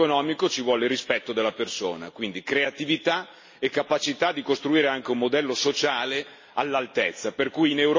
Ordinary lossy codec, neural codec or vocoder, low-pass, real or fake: MP3, 32 kbps; none; 7.2 kHz; real